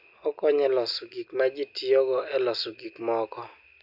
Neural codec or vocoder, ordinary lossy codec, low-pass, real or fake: none; none; 5.4 kHz; real